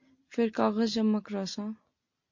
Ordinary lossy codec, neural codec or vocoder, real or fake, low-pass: MP3, 48 kbps; none; real; 7.2 kHz